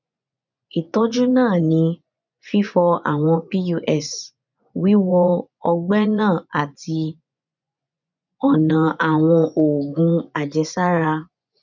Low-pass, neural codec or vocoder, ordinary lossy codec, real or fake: 7.2 kHz; vocoder, 24 kHz, 100 mel bands, Vocos; none; fake